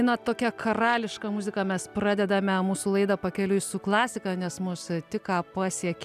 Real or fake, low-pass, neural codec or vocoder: real; 14.4 kHz; none